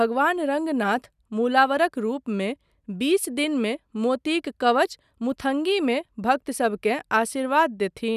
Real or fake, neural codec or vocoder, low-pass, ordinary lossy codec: real; none; 14.4 kHz; none